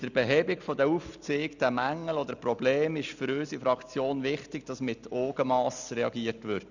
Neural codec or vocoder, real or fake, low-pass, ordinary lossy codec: none; real; 7.2 kHz; none